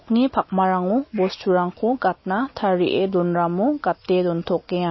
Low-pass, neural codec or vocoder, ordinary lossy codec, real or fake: 7.2 kHz; none; MP3, 24 kbps; real